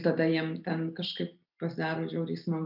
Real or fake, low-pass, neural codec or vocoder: real; 5.4 kHz; none